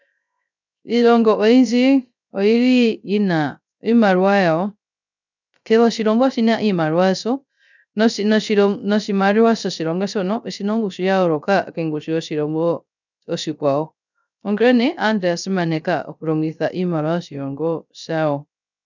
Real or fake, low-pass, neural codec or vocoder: fake; 7.2 kHz; codec, 16 kHz, 0.3 kbps, FocalCodec